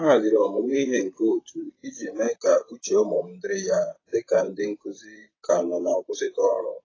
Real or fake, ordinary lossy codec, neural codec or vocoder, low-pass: fake; AAC, 32 kbps; vocoder, 44.1 kHz, 128 mel bands, Pupu-Vocoder; 7.2 kHz